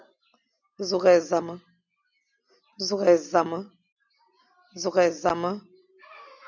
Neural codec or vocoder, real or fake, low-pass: none; real; 7.2 kHz